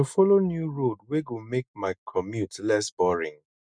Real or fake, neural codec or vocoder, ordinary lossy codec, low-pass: real; none; none; 9.9 kHz